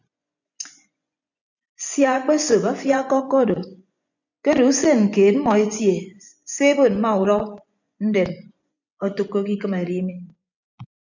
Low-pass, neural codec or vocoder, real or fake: 7.2 kHz; vocoder, 24 kHz, 100 mel bands, Vocos; fake